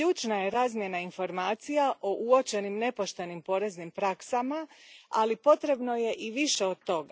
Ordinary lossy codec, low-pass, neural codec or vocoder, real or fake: none; none; none; real